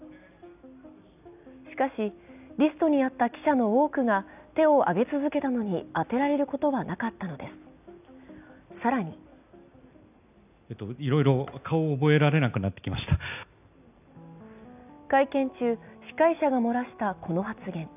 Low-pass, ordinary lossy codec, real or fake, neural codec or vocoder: 3.6 kHz; none; real; none